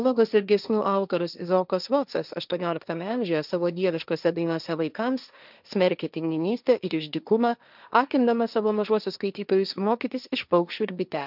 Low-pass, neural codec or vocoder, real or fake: 5.4 kHz; codec, 16 kHz, 1.1 kbps, Voila-Tokenizer; fake